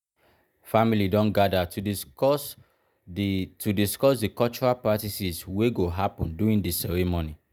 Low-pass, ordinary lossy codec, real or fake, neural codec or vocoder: none; none; real; none